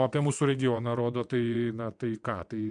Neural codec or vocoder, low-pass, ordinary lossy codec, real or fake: vocoder, 22.05 kHz, 80 mel bands, WaveNeXt; 9.9 kHz; MP3, 64 kbps; fake